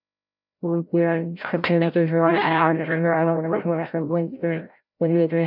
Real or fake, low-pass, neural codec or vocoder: fake; 5.4 kHz; codec, 16 kHz, 0.5 kbps, FreqCodec, larger model